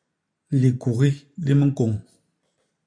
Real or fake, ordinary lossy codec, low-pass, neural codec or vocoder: real; MP3, 64 kbps; 9.9 kHz; none